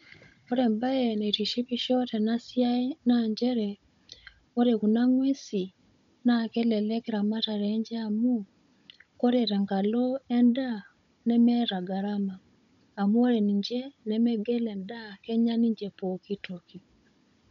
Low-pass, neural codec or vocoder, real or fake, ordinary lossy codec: 7.2 kHz; codec, 16 kHz, 16 kbps, FunCodec, trained on Chinese and English, 50 frames a second; fake; MP3, 64 kbps